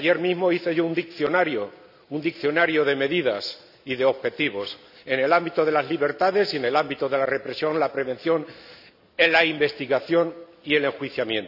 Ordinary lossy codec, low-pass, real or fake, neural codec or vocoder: none; 5.4 kHz; real; none